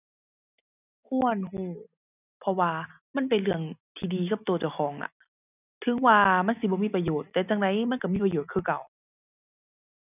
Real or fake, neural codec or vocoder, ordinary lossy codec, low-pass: real; none; none; 3.6 kHz